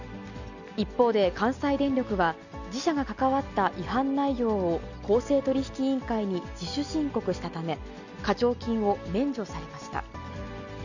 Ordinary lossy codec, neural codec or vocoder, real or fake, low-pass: none; none; real; 7.2 kHz